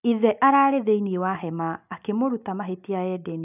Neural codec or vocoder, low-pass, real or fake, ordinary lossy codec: none; 3.6 kHz; real; none